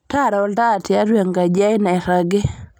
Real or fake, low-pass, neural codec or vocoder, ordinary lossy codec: real; none; none; none